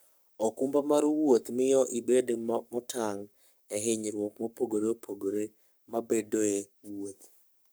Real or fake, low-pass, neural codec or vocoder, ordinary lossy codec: fake; none; codec, 44.1 kHz, 7.8 kbps, Pupu-Codec; none